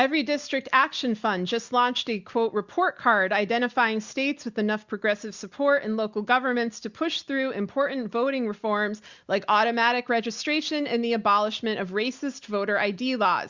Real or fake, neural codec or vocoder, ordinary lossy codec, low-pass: real; none; Opus, 64 kbps; 7.2 kHz